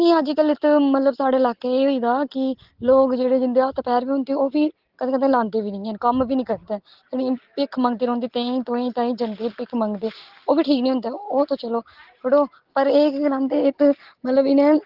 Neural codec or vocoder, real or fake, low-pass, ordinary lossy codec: none; real; 5.4 kHz; Opus, 16 kbps